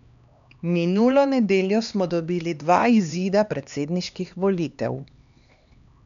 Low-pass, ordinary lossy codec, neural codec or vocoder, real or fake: 7.2 kHz; none; codec, 16 kHz, 2 kbps, X-Codec, HuBERT features, trained on LibriSpeech; fake